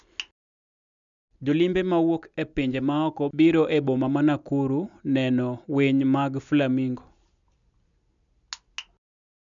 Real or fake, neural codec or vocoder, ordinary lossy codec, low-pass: real; none; none; 7.2 kHz